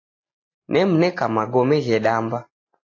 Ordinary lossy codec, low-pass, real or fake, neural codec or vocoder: AAC, 32 kbps; 7.2 kHz; real; none